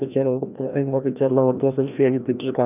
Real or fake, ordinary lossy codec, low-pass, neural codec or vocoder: fake; none; 3.6 kHz; codec, 16 kHz, 1 kbps, FreqCodec, larger model